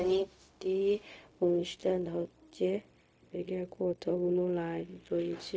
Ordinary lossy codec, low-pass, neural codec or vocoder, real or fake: none; none; codec, 16 kHz, 0.4 kbps, LongCat-Audio-Codec; fake